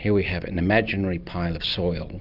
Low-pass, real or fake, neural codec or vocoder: 5.4 kHz; real; none